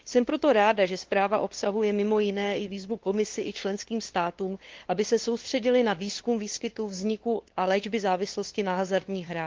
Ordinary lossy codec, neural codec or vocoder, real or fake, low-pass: Opus, 16 kbps; codec, 16 kHz, 2 kbps, FunCodec, trained on LibriTTS, 25 frames a second; fake; 7.2 kHz